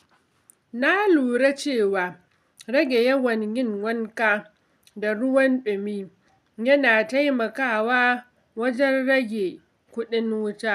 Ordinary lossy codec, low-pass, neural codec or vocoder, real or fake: none; 14.4 kHz; none; real